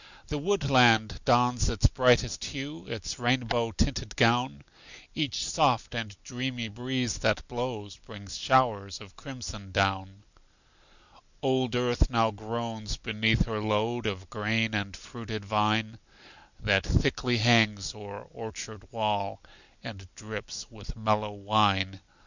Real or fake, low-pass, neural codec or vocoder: real; 7.2 kHz; none